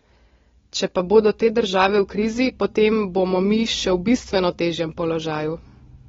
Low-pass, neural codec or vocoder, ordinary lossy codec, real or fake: 7.2 kHz; none; AAC, 24 kbps; real